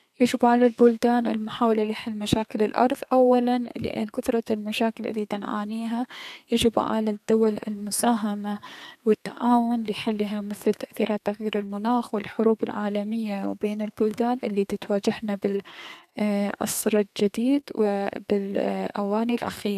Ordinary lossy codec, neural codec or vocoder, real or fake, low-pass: none; codec, 32 kHz, 1.9 kbps, SNAC; fake; 14.4 kHz